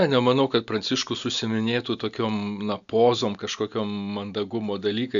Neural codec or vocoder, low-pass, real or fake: none; 7.2 kHz; real